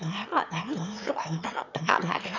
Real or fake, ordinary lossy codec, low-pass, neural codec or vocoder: fake; none; 7.2 kHz; autoencoder, 22.05 kHz, a latent of 192 numbers a frame, VITS, trained on one speaker